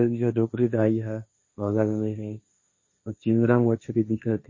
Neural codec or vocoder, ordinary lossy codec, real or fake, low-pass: codec, 16 kHz, 1.1 kbps, Voila-Tokenizer; MP3, 32 kbps; fake; 7.2 kHz